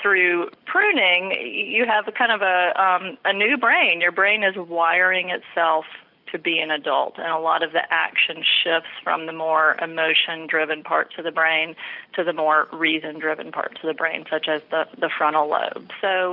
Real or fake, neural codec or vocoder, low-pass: real; none; 5.4 kHz